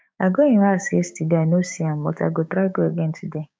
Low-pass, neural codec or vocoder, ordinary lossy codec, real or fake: none; codec, 16 kHz, 6 kbps, DAC; none; fake